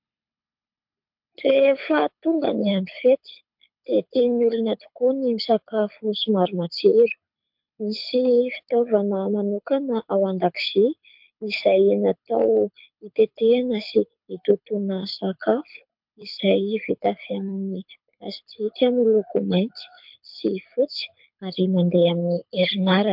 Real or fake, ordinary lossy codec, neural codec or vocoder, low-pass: fake; MP3, 48 kbps; codec, 24 kHz, 6 kbps, HILCodec; 5.4 kHz